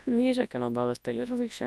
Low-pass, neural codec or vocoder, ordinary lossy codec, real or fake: none; codec, 24 kHz, 0.9 kbps, WavTokenizer, large speech release; none; fake